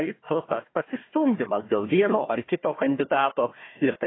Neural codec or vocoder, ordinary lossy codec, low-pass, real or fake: codec, 16 kHz, 1 kbps, FunCodec, trained on Chinese and English, 50 frames a second; AAC, 16 kbps; 7.2 kHz; fake